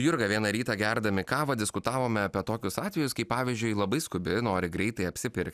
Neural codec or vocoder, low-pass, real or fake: none; 14.4 kHz; real